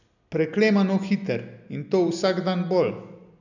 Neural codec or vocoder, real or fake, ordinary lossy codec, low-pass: none; real; none; 7.2 kHz